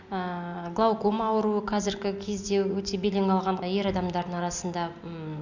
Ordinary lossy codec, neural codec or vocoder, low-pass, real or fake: none; none; 7.2 kHz; real